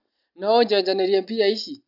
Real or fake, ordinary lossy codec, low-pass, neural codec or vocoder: real; none; 5.4 kHz; none